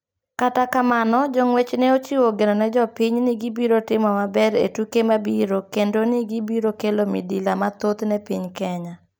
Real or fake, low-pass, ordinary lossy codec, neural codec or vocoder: real; none; none; none